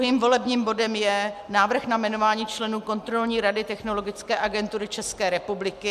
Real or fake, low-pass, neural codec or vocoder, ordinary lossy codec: real; 14.4 kHz; none; MP3, 96 kbps